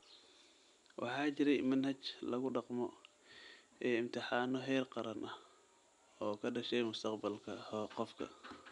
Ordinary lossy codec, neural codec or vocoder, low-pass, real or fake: none; none; 10.8 kHz; real